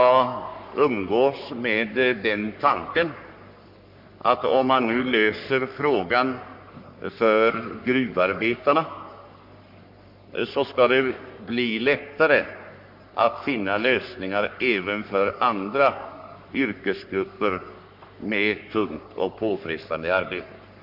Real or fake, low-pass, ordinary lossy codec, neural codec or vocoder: fake; 5.4 kHz; MP3, 48 kbps; codec, 44.1 kHz, 3.4 kbps, Pupu-Codec